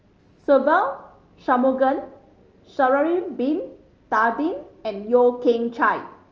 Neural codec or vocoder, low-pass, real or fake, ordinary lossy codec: none; 7.2 kHz; real; Opus, 24 kbps